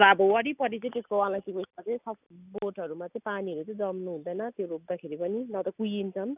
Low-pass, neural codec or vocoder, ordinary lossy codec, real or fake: 3.6 kHz; none; none; real